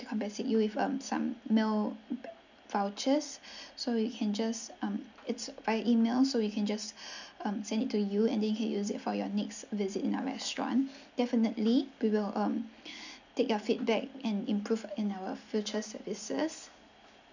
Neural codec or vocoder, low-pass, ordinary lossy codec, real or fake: none; 7.2 kHz; none; real